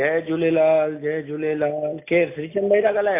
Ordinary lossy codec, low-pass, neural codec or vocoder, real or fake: MP3, 24 kbps; 3.6 kHz; none; real